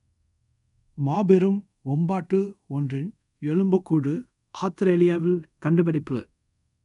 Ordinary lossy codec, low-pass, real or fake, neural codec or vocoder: none; 10.8 kHz; fake; codec, 24 kHz, 0.5 kbps, DualCodec